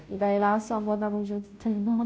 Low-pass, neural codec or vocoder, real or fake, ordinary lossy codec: none; codec, 16 kHz, 0.5 kbps, FunCodec, trained on Chinese and English, 25 frames a second; fake; none